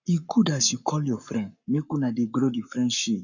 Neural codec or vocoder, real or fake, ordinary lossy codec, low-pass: codec, 44.1 kHz, 7.8 kbps, Pupu-Codec; fake; none; 7.2 kHz